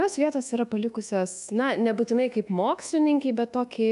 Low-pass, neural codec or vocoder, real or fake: 10.8 kHz; codec, 24 kHz, 1.2 kbps, DualCodec; fake